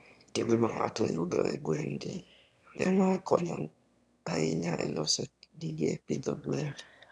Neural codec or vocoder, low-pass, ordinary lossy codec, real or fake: autoencoder, 22.05 kHz, a latent of 192 numbers a frame, VITS, trained on one speaker; none; none; fake